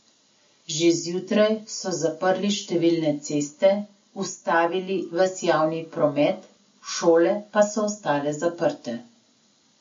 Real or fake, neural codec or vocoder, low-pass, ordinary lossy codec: real; none; 7.2 kHz; MP3, 48 kbps